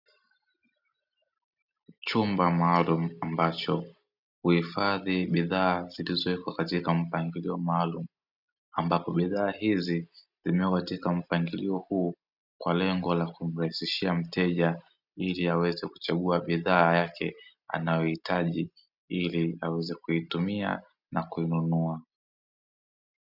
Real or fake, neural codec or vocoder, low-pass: real; none; 5.4 kHz